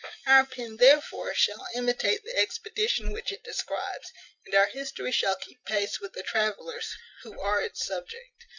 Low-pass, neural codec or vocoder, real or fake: 7.2 kHz; none; real